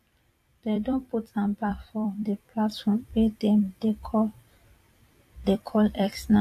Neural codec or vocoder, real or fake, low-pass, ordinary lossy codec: vocoder, 44.1 kHz, 128 mel bands every 256 samples, BigVGAN v2; fake; 14.4 kHz; AAC, 64 kbps